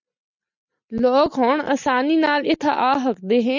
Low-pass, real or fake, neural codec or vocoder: 7.2 kHz; real; none